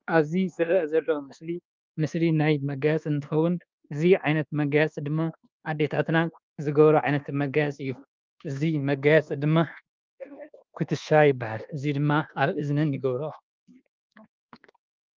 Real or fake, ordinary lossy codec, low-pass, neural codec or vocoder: fake; Opus, 32 kbps; 7.2 kHz; codec, 24 kHz, 1.2 kbps, DualCodec